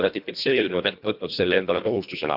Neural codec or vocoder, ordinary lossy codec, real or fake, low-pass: codec, 24 kHz, 1.5 kbps, HILCodec; none; fake; 5.4 kHz